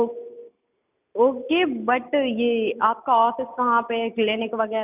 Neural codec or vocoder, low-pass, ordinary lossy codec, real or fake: none; 3.6 kHz; none; real